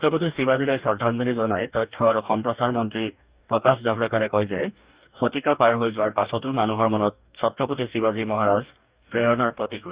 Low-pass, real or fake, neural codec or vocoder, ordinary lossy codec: 3.6 kHz; fake; codec, 44.1 kHz, 2.6 kbps, DAC; Opus, 64 kbps